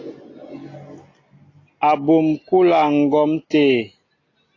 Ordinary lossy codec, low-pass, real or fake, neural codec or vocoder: AAC, 32 kbps; 7.2 kHz; real; none